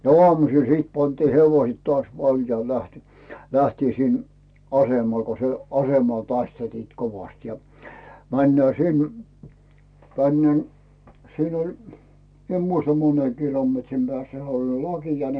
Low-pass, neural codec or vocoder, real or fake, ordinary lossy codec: 9.9 kHz; none; real; none